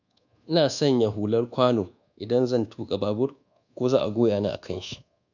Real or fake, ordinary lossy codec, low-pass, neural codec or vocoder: fake; none; 7.2 kHz; codec, 24 kHz, 1.2 kbps, DualCodec